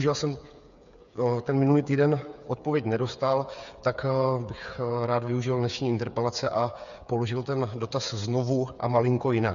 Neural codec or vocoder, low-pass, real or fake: codec, 16 kHz, 8 kbps, FreqCodec, smaller model; 7.2 kHz; fake